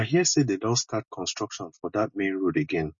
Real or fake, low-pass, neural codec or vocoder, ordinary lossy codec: real; 7.2 kHz; none; MP3, 32 kbps